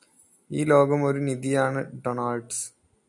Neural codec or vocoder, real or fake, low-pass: none; real; 10.8 kHz